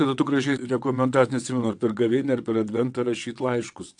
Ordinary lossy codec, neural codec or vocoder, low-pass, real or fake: AAC, 64 kbps; vocoder, 22.05 kHz, 80 mel bands, WaveNeXt; 9.9 kHz; fake